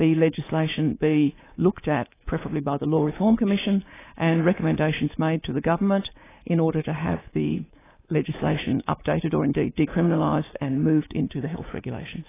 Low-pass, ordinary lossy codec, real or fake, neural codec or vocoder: 3.6 kHz; AAC, 16 kbps; fake; codec, 24 kHz, 3.1 kbps, DualCodec